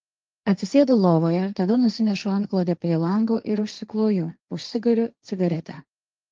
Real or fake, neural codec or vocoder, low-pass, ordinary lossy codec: fake; codec, 16 kHz, 1.1 kbps, Voila-Tokenizer; 7.2 kHz; Opus, 32 kbps